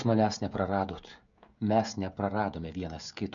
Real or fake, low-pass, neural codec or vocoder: fake; 7.2 kHz; codec, 16 kHz, 16 kbps, FreqCodec, smaller model